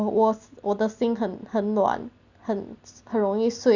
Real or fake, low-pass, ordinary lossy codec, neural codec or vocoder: real; 7.2 kHz; none; none